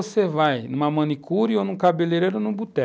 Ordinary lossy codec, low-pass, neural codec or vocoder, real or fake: none; none; none; real